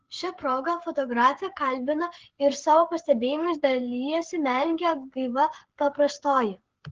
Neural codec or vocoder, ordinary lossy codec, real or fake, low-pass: codec, 16 kHz, 8 kbps, FreqCodec, smaller model; Opus, 16 kbps; fake; 7.2 kHz